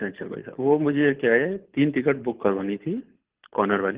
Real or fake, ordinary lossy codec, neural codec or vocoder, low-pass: fake; Opus, 16 kbps; codec, 24 kHz, 6 kbps, HILCodec; 3.6 kHz